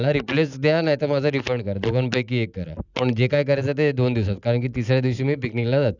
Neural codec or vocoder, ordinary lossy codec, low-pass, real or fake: none; none; 7.2 kHz; real